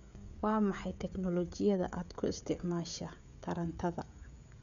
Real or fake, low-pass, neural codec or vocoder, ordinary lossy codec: fake; 7.2 kHz; codec, 16 kHz, 16 kbps, FreqCodec, smaller model; none